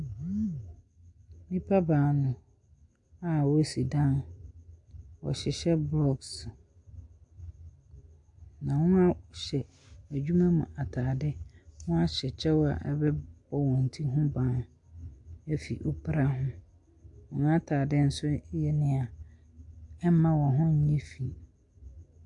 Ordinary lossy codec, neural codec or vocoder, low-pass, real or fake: AAC, 64 kbps; none; 10.8 kHz; real